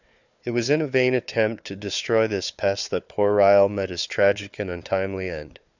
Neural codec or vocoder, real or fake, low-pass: codec, 16 kHz, 4 kbps, FunCodec, trained on Chinese and English, 50 frames a second; fake; 7.2 kHz